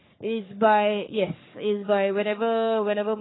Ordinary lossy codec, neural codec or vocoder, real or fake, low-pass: AAC, 16 kbps; codec, 44.1 kHz, 3.4 kbps, Pupu-Codec; fake; 7.2 kHz